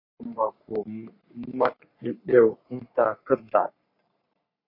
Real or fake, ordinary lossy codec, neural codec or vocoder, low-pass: fake; MP3, 32 kbps; codec, 44.1 kHz, 3.4 kbps, Pupu-Codec; 5.4 kHz